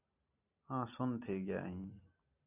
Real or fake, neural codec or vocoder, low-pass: real; none; 3.6 kHz